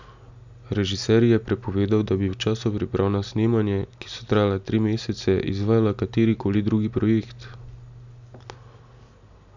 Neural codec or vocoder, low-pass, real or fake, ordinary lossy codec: none; 7.2 kHz; real; none